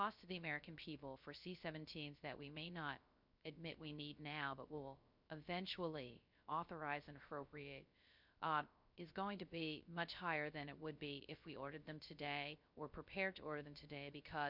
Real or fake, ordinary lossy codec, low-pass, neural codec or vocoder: fake; MP3, 48 kbps; 5.4 kHz; codec, 16 kHz, 0.3 kbps, FocalCodec